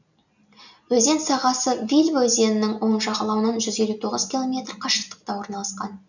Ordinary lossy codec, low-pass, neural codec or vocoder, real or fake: none; 7.2 kHz; none; real